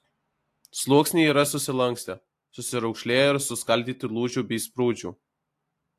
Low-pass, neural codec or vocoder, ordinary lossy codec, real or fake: 14.4 kHz; none; AAC, 64 kbps; real